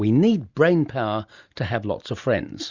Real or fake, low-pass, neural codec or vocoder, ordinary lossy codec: real; 7.2 kHz; none; Opus, 64 kbps